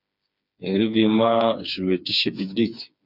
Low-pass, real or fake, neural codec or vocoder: 5.4 kHz; fake; codec, 16 kHz, 4 kbps, FreqCodec, smaller model